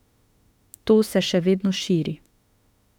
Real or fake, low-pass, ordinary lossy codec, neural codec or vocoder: fake; 19.8 kHz; none; autoencoder, 48 kHz, 32 numbers a frame, DAC-VAE, trained on Japanese speech